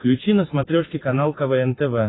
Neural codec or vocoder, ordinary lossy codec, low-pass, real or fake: none; AAC, 16 kbps; 7.2 kHz; real